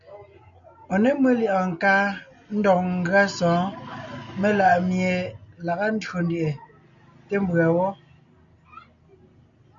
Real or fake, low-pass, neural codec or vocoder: real; 7.2 kHz; none